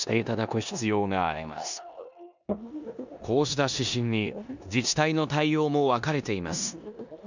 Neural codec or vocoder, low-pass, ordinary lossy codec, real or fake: codec, 16 kHz in and 24 kHz out, 0.9 kbps, LongCat-Audio-Codec, four codebook decoder; 7.2 kHz; none; fake